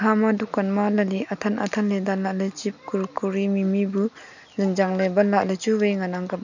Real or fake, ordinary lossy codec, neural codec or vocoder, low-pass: real; none; none; 7.2 kHz